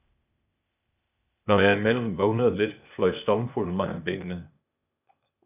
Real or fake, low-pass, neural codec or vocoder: fake; 3.6 kHz; codec, 16 kHz, 0.8 kbps, ZipCodec